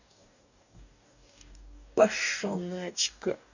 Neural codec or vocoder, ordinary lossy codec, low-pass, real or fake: codec, 44.1 kHz, 2.6 kbps, DAC; none; 7.2 kHz; fake